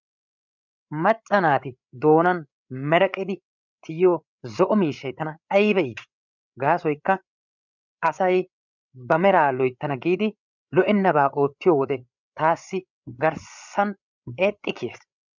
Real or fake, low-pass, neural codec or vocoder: fake; 7.2 kHz; codec, 16 kHz, 8 kbps, FreqCodec, larger model